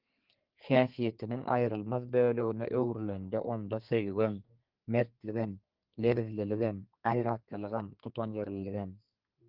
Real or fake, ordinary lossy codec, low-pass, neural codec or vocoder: fake; Opus, 32 kbps; 5.4 kHz; codec, 32 kHz, 1.9 kbps, SNAC